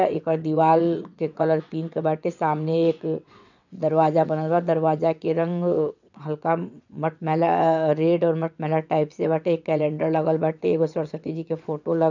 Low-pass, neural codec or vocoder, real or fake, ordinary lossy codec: 7.2 kHz; vocoder, 22.05 kHz, 80 mel bands, WaveNeXt; fake; none